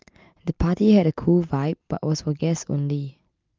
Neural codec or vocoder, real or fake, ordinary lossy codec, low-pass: none; real; Opus, 32 kbps; 7.2 kHz